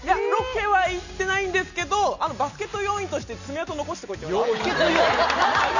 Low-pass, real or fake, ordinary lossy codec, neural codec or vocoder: 7.2 kHz; real; none; none